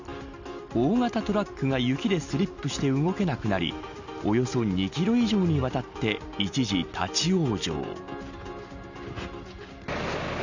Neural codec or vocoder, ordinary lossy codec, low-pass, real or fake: none; none; 7.2 kHz; real